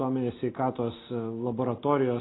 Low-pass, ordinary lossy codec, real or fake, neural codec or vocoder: 7.2 kHz; AAC, 16 kbps; real; none